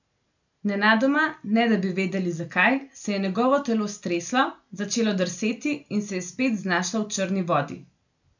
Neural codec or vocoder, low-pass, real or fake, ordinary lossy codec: none; 7.2 kHz; real; none